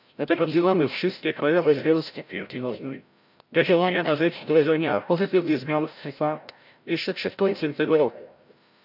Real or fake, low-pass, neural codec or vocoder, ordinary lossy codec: fake; 5.4 kHz; codec, 16 kHz, 0.5 kbps, FreqCodec, larger model; none